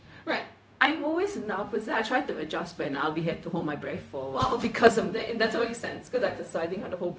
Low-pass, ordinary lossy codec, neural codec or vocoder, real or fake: none; none; codec, 16 kHz, 0.4 kbps, LongCat-Audio-Codec; fake